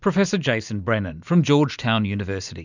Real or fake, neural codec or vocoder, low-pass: real; none; 7.2 kHz